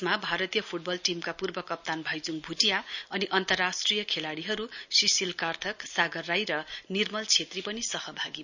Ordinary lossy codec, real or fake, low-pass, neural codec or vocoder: none; real; 7.2 kHz; none